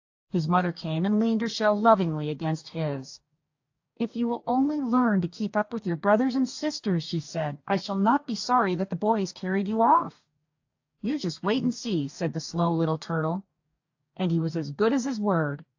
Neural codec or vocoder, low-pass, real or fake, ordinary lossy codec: codec, 44.1 kHz, 2.6 kbps, DAC; 7.2 kHz; fake; AAC, 48 kbps